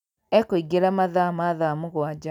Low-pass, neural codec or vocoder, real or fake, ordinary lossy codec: 19.8 kHz; none; real; none